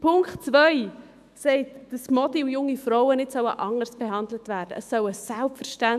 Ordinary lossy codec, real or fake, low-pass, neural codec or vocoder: none; fake; 14.4 kHz; autoencoder, 48 kHz, 128 numbers a frame, DAC-VAE, trained on Japanese speech